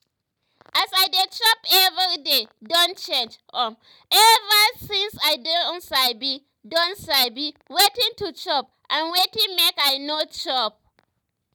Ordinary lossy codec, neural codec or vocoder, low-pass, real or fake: none; none; none; real